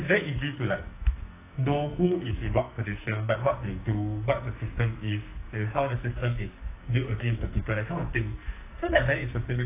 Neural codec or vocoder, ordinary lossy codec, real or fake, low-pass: codec, 44.1 kHz, 2.6 kbps, SNAC; AAC, 24 kbps; fake; 3.6 kHz